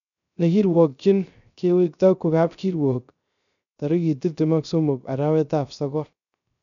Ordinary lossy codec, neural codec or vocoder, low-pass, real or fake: none; codec, 16 kHz, 0.3 kbps, FocalCodec; 7.2 kHz; fake